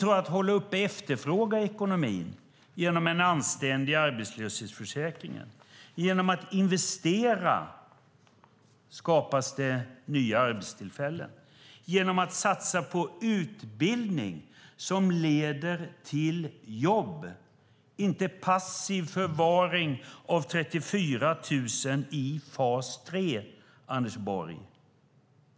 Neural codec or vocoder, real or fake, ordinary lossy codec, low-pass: none; real; none; none